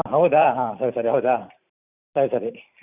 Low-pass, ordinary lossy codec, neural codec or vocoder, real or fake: 3.6 kHz; none; vocoder, 44.1 kHz, 128 mel bands every 512 samples, BigVGAN v2; fake